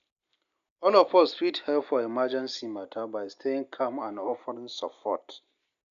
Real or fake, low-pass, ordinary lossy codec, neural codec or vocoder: real; 7.2 kHz; none; none